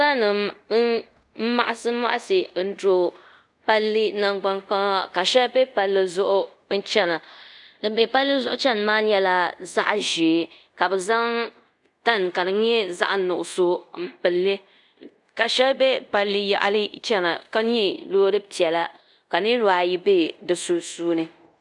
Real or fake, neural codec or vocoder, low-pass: fake; codec, 24 kHz, 0.5 kbps, DualCodec; 10.8 kHz